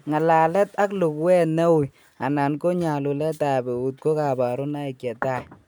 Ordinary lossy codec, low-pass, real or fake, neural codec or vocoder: none; none; real; none